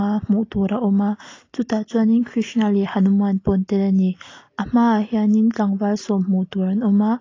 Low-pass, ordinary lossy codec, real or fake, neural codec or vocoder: 7.2 kHz; AAC, 32 kbps; real; none